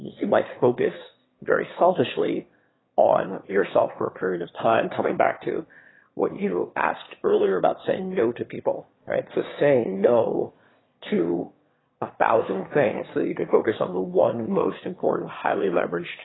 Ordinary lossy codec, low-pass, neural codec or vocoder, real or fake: AAC, 16 kbps; 7.2 kHz; autoencoder, 22.05 kHz, a latent of 192 numbers a frame, VITS, trained on one speaker; fake